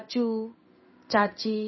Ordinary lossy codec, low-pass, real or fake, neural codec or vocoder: MP3, 24 kbps; 7.2 kHz; fake; codec, 16 kHz in and 24 kHz out, 1 kbps, XY-Tokenizer